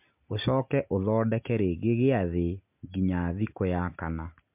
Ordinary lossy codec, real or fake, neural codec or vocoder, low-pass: MP3, 32 kbps; real; none; 3.6 kHz